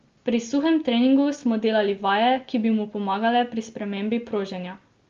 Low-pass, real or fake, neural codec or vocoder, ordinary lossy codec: 7.2 kHz; real; none; Opus, 32 kbps